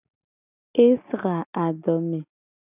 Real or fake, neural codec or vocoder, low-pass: real; none; 3.6 kHz